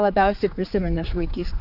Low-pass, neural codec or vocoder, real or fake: 5.4 kHz; codec, 16 kHz, 4 kbps, X-Codec, HuBERT features, trained on general audio; fake